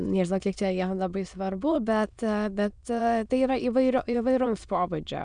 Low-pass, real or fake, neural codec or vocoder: 9.9 kHz; fake; autoencoder, 22.05 kHz, a latent of 192 numbers a frame, VITS, trained on many speakers